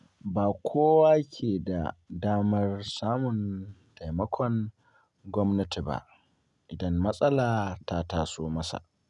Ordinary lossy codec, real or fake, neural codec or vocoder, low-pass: none; real; none; 10.8 kHz